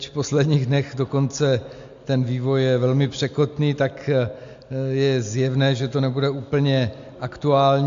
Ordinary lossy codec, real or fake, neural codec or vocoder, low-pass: AAC, 64 kbps; real; none; 7.2 kHz